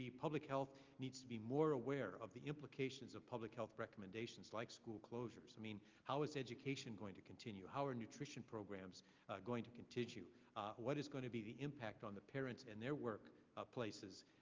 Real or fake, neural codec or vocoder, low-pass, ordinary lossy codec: real; none; 7.2 kHz; Opus, 24 kbps